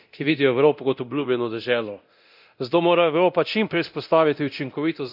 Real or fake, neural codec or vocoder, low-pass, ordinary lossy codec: fake; codec, 24 kHz, 0.9 kbps, DualCodec; 5.4 kHz; none